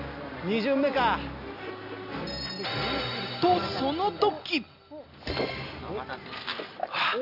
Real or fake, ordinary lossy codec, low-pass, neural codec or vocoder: real; none; 5.4 kHz; none